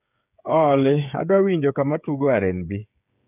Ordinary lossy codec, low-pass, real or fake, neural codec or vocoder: none; 3.6 kHz; fake; codec, 16 kHz, 8 kbps, FreqCodec, smaller model